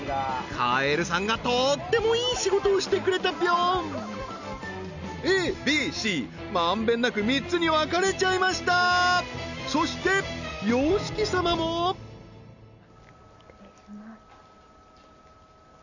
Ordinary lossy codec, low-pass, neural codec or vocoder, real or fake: none; 7.2 kHz; none; real